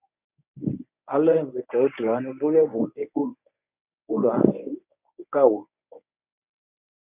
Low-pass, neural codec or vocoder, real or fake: 3.6 kHz; codec, 24 kHz, 0.9 kbps, WavTokenizer, medium speech release version 1; fake